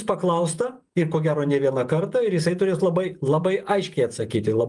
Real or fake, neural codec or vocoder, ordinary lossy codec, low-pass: fake; vocoder, 48 kHz, 128 mel bands, Vocos; Opus, 32 kbps; 10.8 kHz